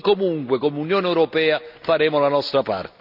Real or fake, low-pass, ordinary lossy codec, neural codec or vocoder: real; 5.4 kHz; none; none